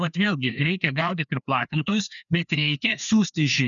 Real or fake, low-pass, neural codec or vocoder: fake; 7.2 kHz; codec, 16 kHz, 2 kbps, FreqCodec, larger model